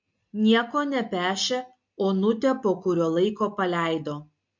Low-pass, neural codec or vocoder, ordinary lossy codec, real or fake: 7.2 kHz; none; MP3, 48 kbps; real